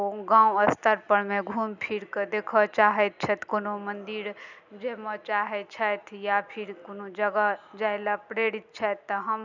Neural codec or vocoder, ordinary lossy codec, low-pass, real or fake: none; none; 7.2 kHz; real